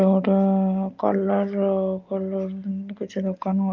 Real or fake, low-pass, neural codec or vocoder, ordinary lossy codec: real; 7.2 kHz; none; Opus, 24 kbps